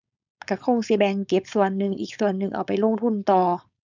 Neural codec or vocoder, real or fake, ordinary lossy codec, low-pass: codec, 16 kHz, 4.8 kbps, FACodec; fake; none; 7.2 kHz